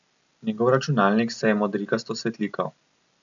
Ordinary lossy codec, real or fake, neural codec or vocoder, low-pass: none; real; none; 7.2 kHz